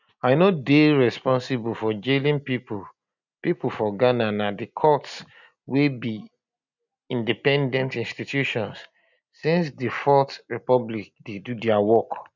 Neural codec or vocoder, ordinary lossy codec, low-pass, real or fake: none; none; 7.2 kHz; real